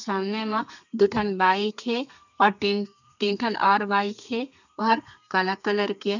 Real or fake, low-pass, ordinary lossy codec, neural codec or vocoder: fake; 7.2 kHz; none; codec, 32 kHz, 1.9 kbps, SNAC